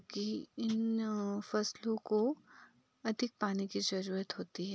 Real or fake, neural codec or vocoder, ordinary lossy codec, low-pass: real; none; none; none